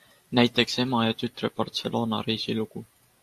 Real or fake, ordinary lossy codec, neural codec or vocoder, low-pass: real; AAC, 64 kbps; none; 14.4 kHz